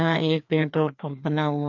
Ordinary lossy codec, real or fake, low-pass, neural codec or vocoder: none; fake; 7.2 kHz; codec, 16 kHz, 2 kbps, FreqCodec, larger model